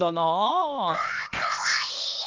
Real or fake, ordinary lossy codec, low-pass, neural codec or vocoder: fake; Opus, 24 kbps; 7.2 kHz; codec, 16 kHz, 0.8 kbps, ZipCodec